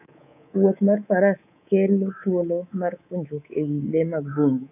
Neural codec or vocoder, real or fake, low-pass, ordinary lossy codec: codec, 24 kHz, 3.1 kbps, DualCodec; fake; 3.6 kHz; none